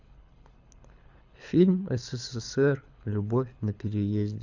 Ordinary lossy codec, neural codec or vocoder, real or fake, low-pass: none; codec, 24 kHz, 6 kbps, HILCodec; fake; 7.2 kHz